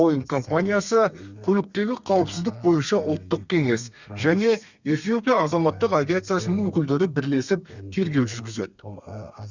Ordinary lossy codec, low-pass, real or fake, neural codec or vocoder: Opus, 64 kbps; 7.2 kHz; fake; codec, 32 kHz, 1.9 kbps, SNAC